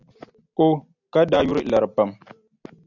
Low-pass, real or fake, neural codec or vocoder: 7.2 kHz; real; none